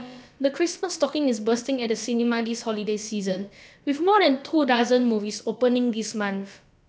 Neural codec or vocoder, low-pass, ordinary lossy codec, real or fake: codec, 16 kHz, about 1 kbps, DyCAST, with the encoder's durations; none; none; fake